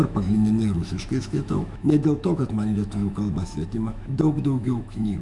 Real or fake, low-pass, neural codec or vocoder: fake; 10.8 kHz; autoencoder, 48 kHz, 128 numbers a frame, DAC-VAE, trained on Japanese speech